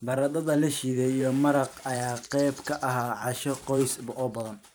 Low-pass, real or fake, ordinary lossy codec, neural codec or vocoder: none; fake; none; vocoder, 44.1 kHz, 128 mel bands every 512 samples, BigVGAN v2